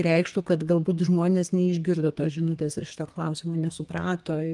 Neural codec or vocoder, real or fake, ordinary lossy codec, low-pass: codec, 32 kHz, 1.9 kbps, SNAC; fake; Opus, 32 kbps; 10.8 kHz